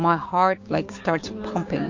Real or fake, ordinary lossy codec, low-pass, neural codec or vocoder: fake; MP3, 48 kbps; 7.2 kHz; codec, 16 kHz, 6 kbps, DAC